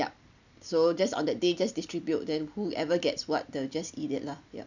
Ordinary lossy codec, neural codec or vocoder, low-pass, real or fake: none; none; 7.2 kHz; real